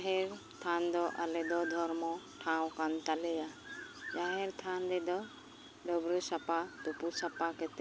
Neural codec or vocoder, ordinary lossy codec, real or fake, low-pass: none; none; real; none